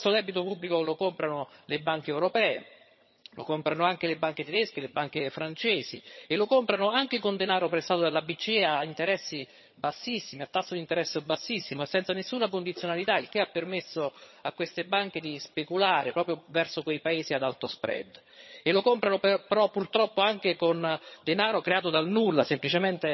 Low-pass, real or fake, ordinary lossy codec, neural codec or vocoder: 7.2 kHz; fake; MP3, 24 kbps; vocoder, 22.05 kHz, 80 mel bands, HiFi-GAN